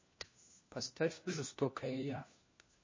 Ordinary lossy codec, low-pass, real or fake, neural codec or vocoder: MP3, 32 kbps; 7.2 kHz; fake; codec, 16 kHz, 1 kbps, FunCodec, trained on LibriTTS, 50 frames a second